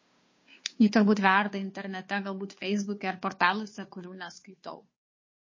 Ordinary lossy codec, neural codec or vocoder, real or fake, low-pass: MP3, 32 kbps; codec, 16 kHz, 2 kbps, FunCodec, trained on Chinese and English, 25 frames a second; fake; 7.2 kHz